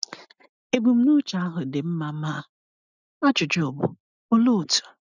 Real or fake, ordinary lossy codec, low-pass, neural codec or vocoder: real; none; 7.2 kHz; none